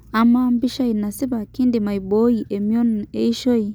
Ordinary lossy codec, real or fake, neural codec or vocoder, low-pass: none; real; none; none